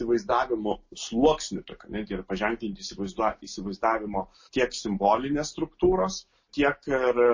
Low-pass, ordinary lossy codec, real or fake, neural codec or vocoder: 7.2 kHz; MP3, 32 kbps; real; none